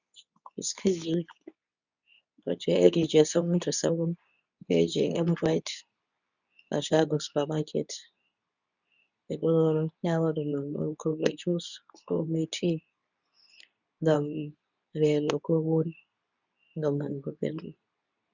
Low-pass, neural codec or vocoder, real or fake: 7.2 kHz; codec, 24 kHz, 0.9 kbps, WavTokenizer, medium speech release version 2; fake